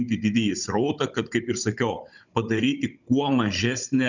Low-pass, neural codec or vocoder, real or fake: 7.2 kHz; codec, 16 kHz, 16 kbps, FunCodec, trained on Chinese and English, 50 frames a second; fake